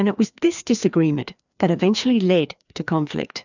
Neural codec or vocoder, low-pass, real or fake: codec, 16 kHz, 2 kbps, FreqCodec, larger model; 7.2 kHz; fake